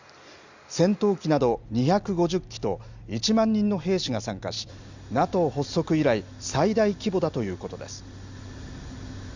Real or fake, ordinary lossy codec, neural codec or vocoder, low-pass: real; Opus, 64 kbps; none; 7.2 kHz